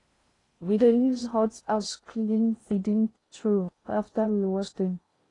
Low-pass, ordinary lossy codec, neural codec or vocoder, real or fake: 10.8 kHz; AAC, 32 kbps; codec, 16 kHz in and 24 kHz out, 0.6 kbps, FocalCodec, streaming, 4096 codes; fake